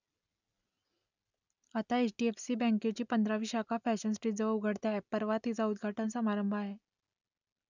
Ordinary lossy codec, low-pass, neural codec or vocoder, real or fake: none; 7.2 kHz; none; real